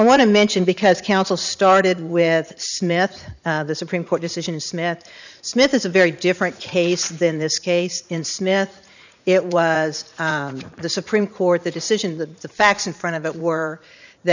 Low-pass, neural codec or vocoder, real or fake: 7.2 kHz; vocoder, 44.1 kHz, 80 mel bands, Vocos; fake